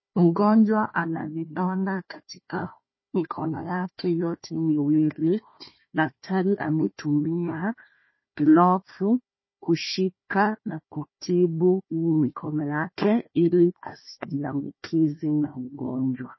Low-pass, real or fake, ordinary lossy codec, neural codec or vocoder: 7.2 kHz; fake; MP3, 24 kbps; codec, 16 kHz, 1 kbps, FunCodec, trained on Chinese and English, 50 frames a second